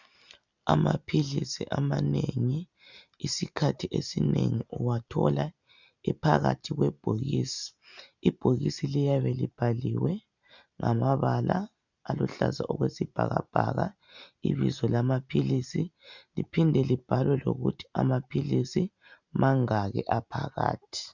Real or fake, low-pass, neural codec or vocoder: real; 7.2 kHz; none